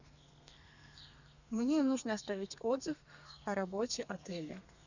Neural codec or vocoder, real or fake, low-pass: codec, 32 kHz, 1.9 kbps, SNAC; fake; 7.2 kHz